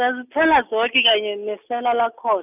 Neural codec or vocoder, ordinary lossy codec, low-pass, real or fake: none; none; 3.6 kHz; real